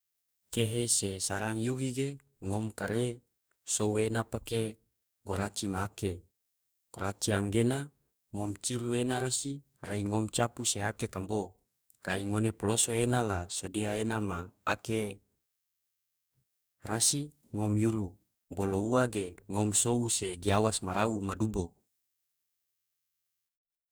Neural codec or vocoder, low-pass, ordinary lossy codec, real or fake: codec, 44.1 kHz, 2.6 kbps, DAC; none; none; fake